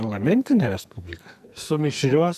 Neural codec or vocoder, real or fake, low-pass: codec, 44.1 kHz, 2.6 kbps, SNAC; fake; 14.4 kHz